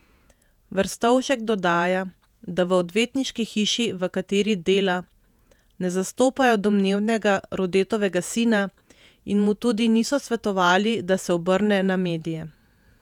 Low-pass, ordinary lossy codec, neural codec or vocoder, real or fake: 19.8 kHz; none; vocoder, 48 kHz, 128 mel bands, Vocos; fake